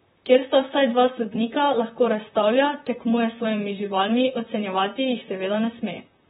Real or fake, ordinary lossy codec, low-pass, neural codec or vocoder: fake; AAC, 16 kbps; 19.8 kHz; vocoder, 44.1 kHz, 128 mel bands, Pupu-Vocoder